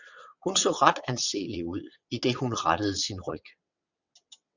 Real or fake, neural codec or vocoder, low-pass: fake; vocoder, 22.05 kHz, 80 mel bands, WaveNeXt; 7.2 kHz